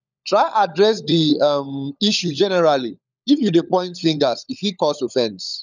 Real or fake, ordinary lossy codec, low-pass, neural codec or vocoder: fake; none; 7.2 kHz; codec, 16 kHz, 16 kbps, FunCodec, trained on LibriTTS, 50 frames a second